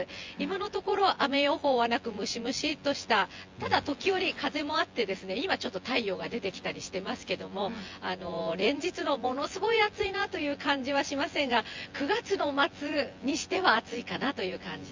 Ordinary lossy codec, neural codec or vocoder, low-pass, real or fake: Opus, 32 kbps; vocoder, 24 kHz, 100 mel bands, Vocos; 7.2 kHz; fake